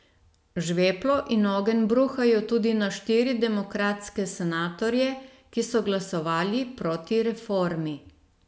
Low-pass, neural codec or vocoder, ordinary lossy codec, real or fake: none; none; none; real